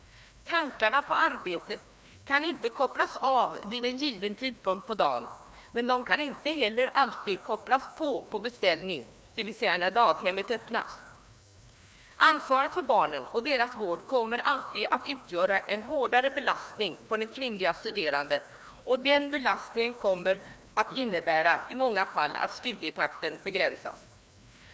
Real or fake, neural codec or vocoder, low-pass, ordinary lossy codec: fake; codec, 16 kHz, 1 kbps, FreqCodec, larger model; none; none